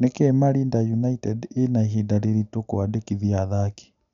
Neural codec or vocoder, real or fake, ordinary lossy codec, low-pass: none; real; none; 7.2 kHz